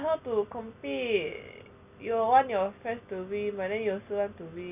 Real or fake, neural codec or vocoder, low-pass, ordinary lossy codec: real; none; 3.6 kHz; none